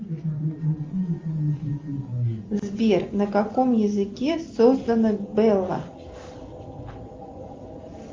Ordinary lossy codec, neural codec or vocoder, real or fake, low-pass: Opus, 32 kbps; none; real; 7.2 kHz